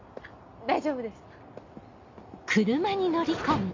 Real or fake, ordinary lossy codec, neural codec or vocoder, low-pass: real; MP3, 48 kbps; none; 7.2 kHz